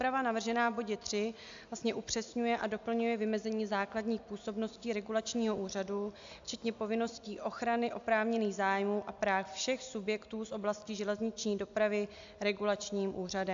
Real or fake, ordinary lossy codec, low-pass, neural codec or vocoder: real; AAC, 64 kbps; 7.2 kHz; none